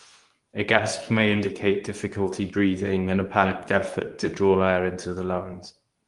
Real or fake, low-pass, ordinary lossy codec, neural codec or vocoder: fake; 10.8 kHz; Opus, 32 kbps; codec, 24 kHz, 0.9 kbps, WavTokenizer, medium speech release version 2